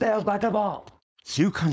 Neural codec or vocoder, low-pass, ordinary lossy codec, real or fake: codec, 16 kHz, 4.8 kbps, FACodec; none; none; fake